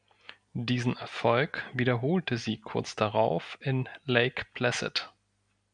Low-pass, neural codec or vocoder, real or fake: 9.9 kHz; none; real